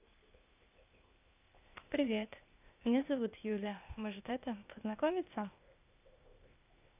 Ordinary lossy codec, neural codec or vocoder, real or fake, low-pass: none; codec, 16 kHz, 0.8 kbps, ZipCodec; fake; 3.6 kHz